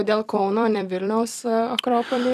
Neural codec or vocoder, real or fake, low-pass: vocoder, 44.1 kHz, 128 mel bands every 256 samples, BigVGAN v2; fake; 14.4 kHz